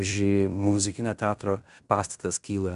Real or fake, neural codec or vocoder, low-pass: fake; codec, 16 kHz in and 24 kHz out, 0.9 kbps, LongCat-Audio-Codec, fine tuned four codebook decoder; 10.8 kHz